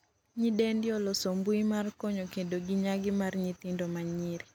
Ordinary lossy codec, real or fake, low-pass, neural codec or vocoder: none; real; 19.8 kHz; none